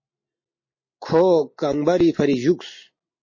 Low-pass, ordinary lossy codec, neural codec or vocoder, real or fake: 7.2 kHz; MP3, 32 kbps; none; real